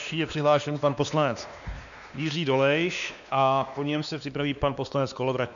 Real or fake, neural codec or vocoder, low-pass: fake; codec, 16 kHz, 2 kbps, X-Codec, WavLM features, trained on Multilingual LibriSpeech; 7.2 kHz